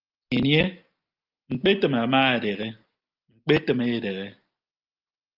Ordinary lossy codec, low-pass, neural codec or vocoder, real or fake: Opus, 32 kbps; 5.4 kHz; none; real